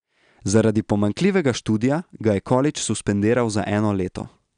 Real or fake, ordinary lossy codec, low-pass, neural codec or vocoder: real; none; 9.9 kHz; none